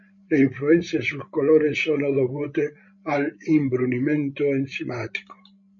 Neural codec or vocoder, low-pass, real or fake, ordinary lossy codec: codec, 16 kHz, 16 kbps, FreqCodec, larger model; 7.2 kHz; fake; MP3, 48 kbps